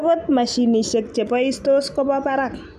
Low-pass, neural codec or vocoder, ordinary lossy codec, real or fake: 14.4 kHz; none; none; real